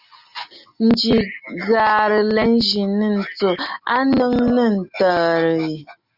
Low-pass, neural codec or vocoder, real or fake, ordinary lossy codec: 5.4 kHz; none; real; Opus, 64 kbps